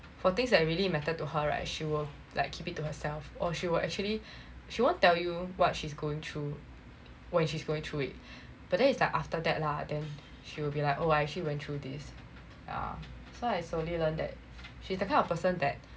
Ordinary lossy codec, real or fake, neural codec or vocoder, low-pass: none; real; none; none